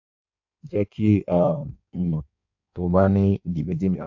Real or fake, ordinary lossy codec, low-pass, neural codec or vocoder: fake; AAC, 48 kbps; 7.2 kHz; codec, 16 kHz in and 24 kHz out, 1.1 kbps, FireRedTTS-2 codec